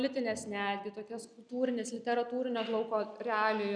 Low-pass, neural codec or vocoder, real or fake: 9.9 kHz; none; real